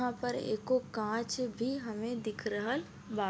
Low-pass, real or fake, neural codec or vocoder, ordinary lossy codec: none; real; none; none